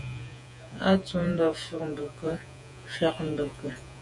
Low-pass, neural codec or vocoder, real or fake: 10.8 kHz; vocoder, 48 kHz, 128 mel bands, Vocos; fake